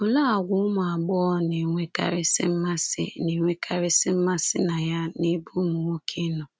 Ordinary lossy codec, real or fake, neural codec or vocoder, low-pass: none; real; none; none